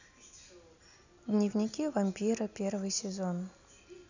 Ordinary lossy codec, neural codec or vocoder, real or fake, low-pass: none; none; real; 7.2 kHz